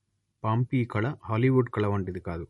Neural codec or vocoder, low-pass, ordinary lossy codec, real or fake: none; 19.8 kHz; MP3, 48 kbps; real